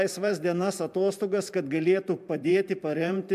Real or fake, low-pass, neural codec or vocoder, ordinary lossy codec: fake; 14.4 kHz; vocoder, 44.1 kHz, 128 mel bands every 512 samples, BigVGAN v2; MP3, 96 kbps